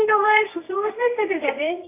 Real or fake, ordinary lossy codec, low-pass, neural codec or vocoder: fake; AAC, 24 kbps; 3.6 kHz; codec, 24 kHz, 0.9 kbps, WavTokenizer, medium music audio release